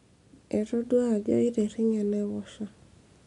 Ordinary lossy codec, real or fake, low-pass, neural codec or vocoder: none; real; 10.8 kHz; none